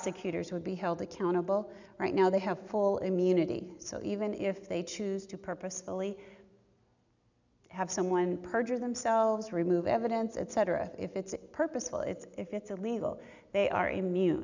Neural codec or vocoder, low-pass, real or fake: none; 7.2 kHz; real